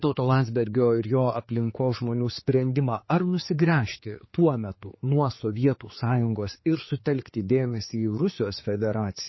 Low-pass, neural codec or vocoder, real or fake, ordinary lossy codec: 7.2 kHz; codec, 16 kHz, 4 kbps, X-Codec, HuBERT features, trained on general audio; fake; MP3, 24 kbps